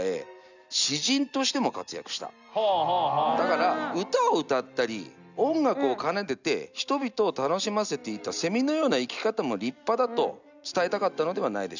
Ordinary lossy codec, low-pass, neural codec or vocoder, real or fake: none; 7.2 kHz; none; real